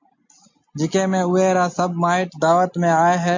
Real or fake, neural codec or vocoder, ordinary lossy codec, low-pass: real; none; MP3, 48 kbps; 7.2 kHz